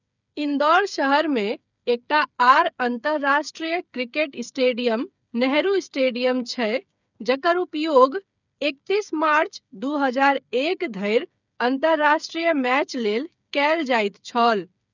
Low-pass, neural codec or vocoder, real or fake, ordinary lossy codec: 7.2 kHz; codec, 16 kHz, 8 kbps, FreqCodec, smaller model; fake; none